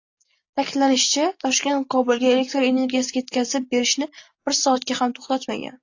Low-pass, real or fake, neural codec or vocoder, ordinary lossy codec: 7.2 kHz; real; none; AAC, 48 kbps